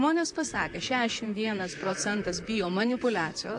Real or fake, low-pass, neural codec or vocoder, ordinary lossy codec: fake; 10.8 kHz; vocoder, 44.1 kHz, 128 mel bands, Pupu-Vocoder; AAC, 64 kbps